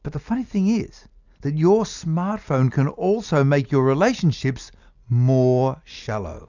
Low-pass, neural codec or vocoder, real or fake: 7.2 kHz; none; real